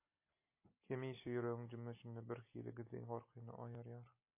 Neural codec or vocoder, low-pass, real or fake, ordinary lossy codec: none; 3.6 kHz; real; MP3, 24 kbps